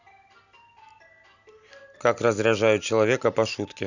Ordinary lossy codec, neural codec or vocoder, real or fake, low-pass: none; none; real; 7.2 kHz